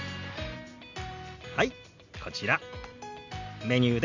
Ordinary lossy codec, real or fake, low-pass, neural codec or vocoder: none; real; 7.2 kHz; none